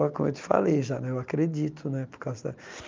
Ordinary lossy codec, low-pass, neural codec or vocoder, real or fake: Opus, 24 kbps; 7.2 kHz; none; real